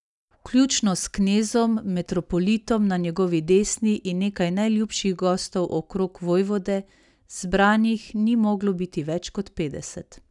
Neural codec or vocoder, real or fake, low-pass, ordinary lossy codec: none; real; 10.8 kHz; none